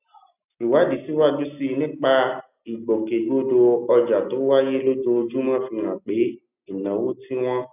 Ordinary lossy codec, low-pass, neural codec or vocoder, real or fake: none; 3.6 kHz; none; real